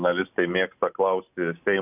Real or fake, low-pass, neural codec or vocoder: real; 3.6 kHz; none